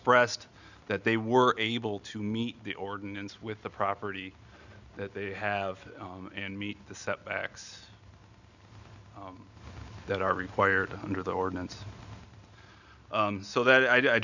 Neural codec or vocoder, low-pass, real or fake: none; 7.2 kHz; real